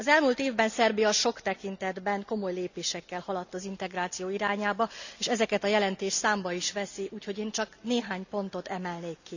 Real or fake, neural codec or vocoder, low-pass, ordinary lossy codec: real; none; 7.2 kHz; none